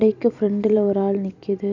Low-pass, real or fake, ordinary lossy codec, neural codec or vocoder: 7.2 kHz; fake; none; vocoder, 44.1 kHz, 128 mel bands every 256 samples, BigVGAN v2